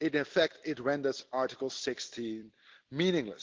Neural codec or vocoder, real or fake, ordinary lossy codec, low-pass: none; real; Opus, 16 kbps; 7.2 kHz